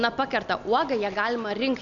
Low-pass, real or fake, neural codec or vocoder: 7.2 kHz; real; none